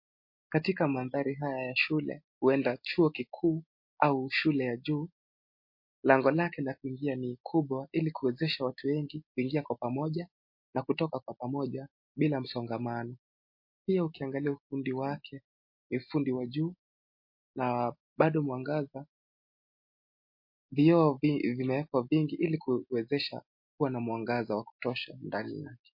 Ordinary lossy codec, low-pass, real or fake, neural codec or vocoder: MP3, 32 kbps; 5.4 kHz; real; none